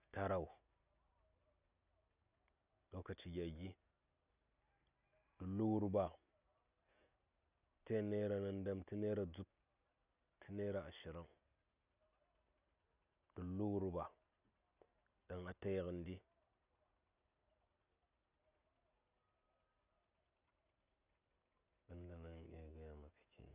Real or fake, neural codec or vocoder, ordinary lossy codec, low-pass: real; none; none; 3.6 kHz